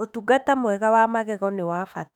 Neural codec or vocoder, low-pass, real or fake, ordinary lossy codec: autoencoder, 48 kHz, 32 numbers a frame, DAC-VAE, trained on Japanese speech; 19.8 kHz; fake; none